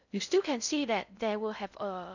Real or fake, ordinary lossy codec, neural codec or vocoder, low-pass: fake; none; codec, 16 kHz in and 24 kHz out, 0.6 kbps, FocalCodec, streaming, 4096 codes; 7.2 kHz